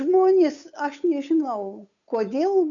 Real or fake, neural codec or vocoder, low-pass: fake; codec, 16 kHz, 8 kbps, FunCodec, trained on Chinese and English, 25 frames a second; 7.2 kHz